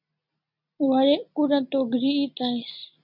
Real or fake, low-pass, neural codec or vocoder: real; 5.4 kHz; none